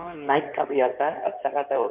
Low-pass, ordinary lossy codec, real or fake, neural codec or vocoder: 3.6 kHz; none; fake; codec, 16 kHz in and 24 kHz out, 2.2 kbps, FireRedTTS-2 codec